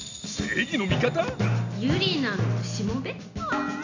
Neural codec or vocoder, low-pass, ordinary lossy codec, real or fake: none; 7.2 kHz; AAC, 48 kbps; real